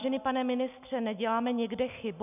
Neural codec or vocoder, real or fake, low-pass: none; real; 3.6 kHz